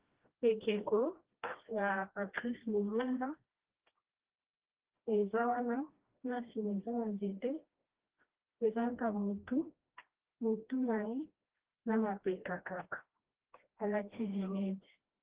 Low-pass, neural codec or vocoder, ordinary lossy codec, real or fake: 3.6 kHz; codec, 16 kHz, 1 kbps, FreqCodec, smaller model; Opus, 16 kbps; fake